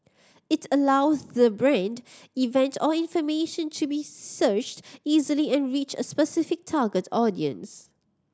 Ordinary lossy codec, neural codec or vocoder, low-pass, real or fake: none; none; none; real